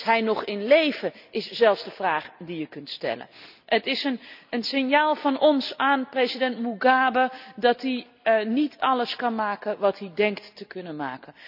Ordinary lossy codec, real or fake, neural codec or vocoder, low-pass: none; real; none; 5.4 kHz